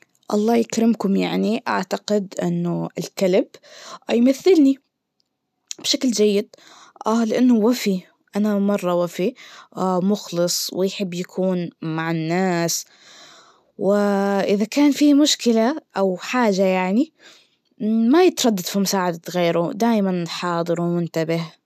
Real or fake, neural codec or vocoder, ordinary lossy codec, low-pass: real; none; none; 14.4 kHz